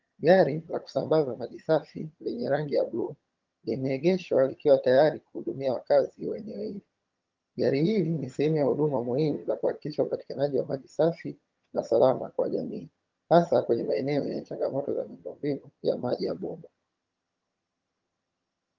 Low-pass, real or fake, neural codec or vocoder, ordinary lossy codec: 7.2 kHz; fake; vocoder, 22.05 kHz, 80 mel bands, HiFi-GAN; Opus, 32 kbps